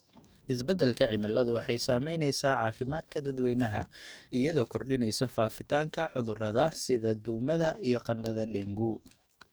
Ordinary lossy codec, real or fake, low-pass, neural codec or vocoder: none; fake; none; codec, 44.1 kHz, 2.6 kbps, DAC